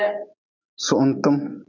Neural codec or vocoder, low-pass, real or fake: none; 7.2 kHz; real